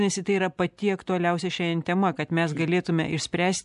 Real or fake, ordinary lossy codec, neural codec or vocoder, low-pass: real; MP3, 96 kbps; none; 10.8 kHz